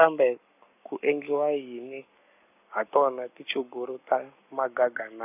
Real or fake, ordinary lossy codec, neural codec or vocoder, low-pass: fake; none; codec, 44.1 kHz, 7.8 kbps, Pupu-Codec; 3.6 kHz